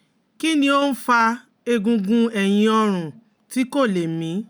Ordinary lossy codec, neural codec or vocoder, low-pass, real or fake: none; none; none; real